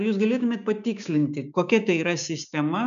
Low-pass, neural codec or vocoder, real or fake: 7.2 kHz; codec, 16 kHz, 6 kbps, DAC; fake